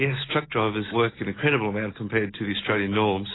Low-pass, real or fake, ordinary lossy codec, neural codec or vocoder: 7.2 kHz; real; AAC, 16 kbps; none